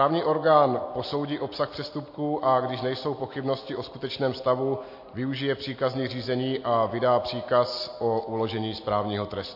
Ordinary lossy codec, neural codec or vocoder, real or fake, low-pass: MP3, 32 kbps; none; real; 5.4 kHz